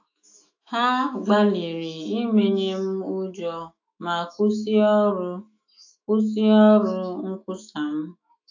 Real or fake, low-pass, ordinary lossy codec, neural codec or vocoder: fake; 7.2 kHz; AAC, 48 kbps; autoencoder, 48 kHz, 128 numbers a frame, DAC-VAE, trained on Japanese speech